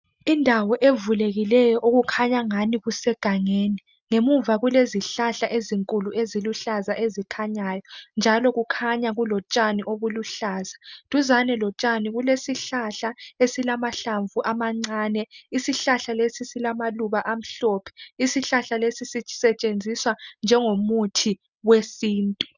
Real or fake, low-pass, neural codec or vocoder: real; 7.2 kHz; none